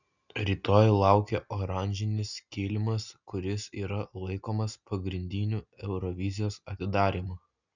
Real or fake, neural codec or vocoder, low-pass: real; none; 7.2 kHz